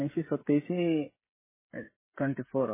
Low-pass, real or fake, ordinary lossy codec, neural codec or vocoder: 3.6 kHz; fake; MP3, 16 kbps; codec, 16 kHz, 16 kbps, FreqCodec, smaller model